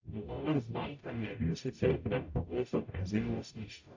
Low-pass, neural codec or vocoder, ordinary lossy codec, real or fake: 7.2 kHz; codec, 44.1 kHz, 0.9 kbps, DAC; AAC, 48 kbps; fake